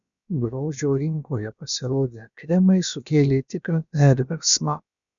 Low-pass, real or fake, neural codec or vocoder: 7.2 kHz; fake; codec, 16 kHz, about 1 kbps, DyCAST, with the encoder's durations